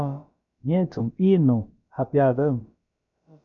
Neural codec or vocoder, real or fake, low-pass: codec, 16 kHz, about 1 kbps, DyCAST, with the encoder's durations; fake; 7.2 kHz